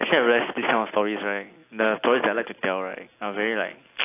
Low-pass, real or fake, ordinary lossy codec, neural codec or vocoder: 3.6 kHz; real; AAC, 24 kbps; none